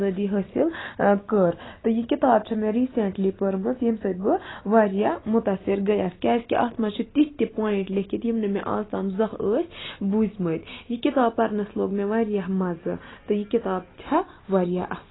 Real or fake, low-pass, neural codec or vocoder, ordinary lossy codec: real; 7.2 kHz; none; AAC, 16 kbps